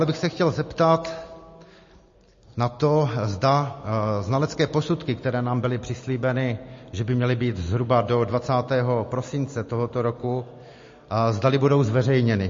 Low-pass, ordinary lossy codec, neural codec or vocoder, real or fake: 7.2 kHz; MP3, 32 kbps; none; real